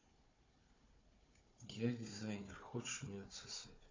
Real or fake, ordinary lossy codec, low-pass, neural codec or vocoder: fake; AAC, 32 kbps; 7.2 kHz; codec, 16 kHz, 4 kbps, FunCodec, trained on Chinese and English, 50 frames a second